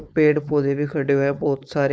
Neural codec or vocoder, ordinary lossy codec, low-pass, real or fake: codec, 16 kHz, 4.8 kbps, FACodec; none; none; fake